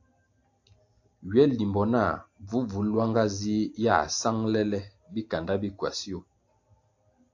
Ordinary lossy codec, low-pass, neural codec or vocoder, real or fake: AAC, 48 kbps; 7.2 kHz; none; real